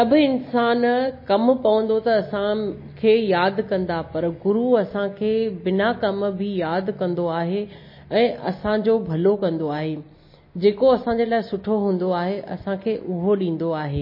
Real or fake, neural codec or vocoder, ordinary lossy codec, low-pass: real; none; MP3, 24 kbps; 5.4 kHz